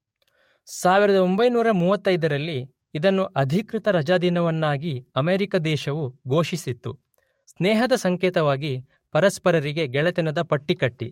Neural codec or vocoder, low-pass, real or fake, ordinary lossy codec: codec, 44.1 kHz, 7.8 kbps, DAC; 19.8 kHz; fake; MP3, 64 kbps